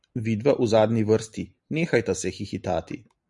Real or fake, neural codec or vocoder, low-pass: real; none; 10.8 kHz